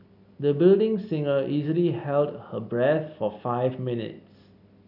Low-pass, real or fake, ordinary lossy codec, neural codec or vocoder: 5.4 kHz; real; none; none